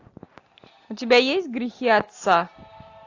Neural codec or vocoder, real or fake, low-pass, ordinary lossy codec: none; real; 7.2 kHz; AAC, 48 kbps